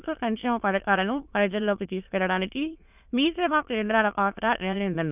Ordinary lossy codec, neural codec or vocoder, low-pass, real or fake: none; autoencoder, 22.05 kHz, a latent of 192 numbers a frame, VITS, trained on many speakers; 3.6 kHz; fake